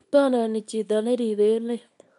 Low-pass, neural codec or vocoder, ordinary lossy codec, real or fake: 10.8 kHz; codec, 24 kHz, 0.9 kbps, WavTokenizer, small release; none; fake